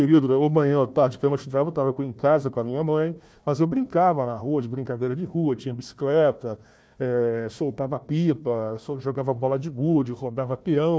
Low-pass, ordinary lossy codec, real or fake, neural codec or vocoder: none; none; fake; codec, 16 kHz, 1 kbps, FunCodec, trained on Chinese and English, 50 frames a second